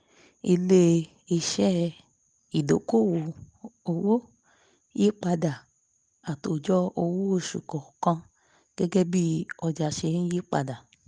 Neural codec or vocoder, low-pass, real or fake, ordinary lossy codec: none; 9.9 kHz; real; Opus, 32 kbps